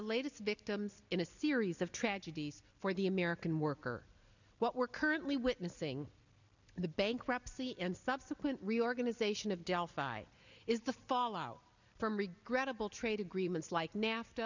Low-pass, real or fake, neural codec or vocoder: 7.2 kHz; real; none